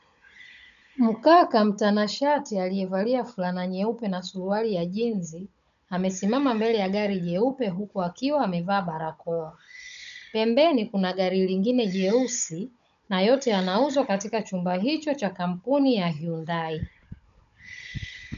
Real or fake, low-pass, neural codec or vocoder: fake; 7.2 kHz; codec, 16 kHz, 16 kbps, FunCodec, trained on Chinese and English, 50 frames a second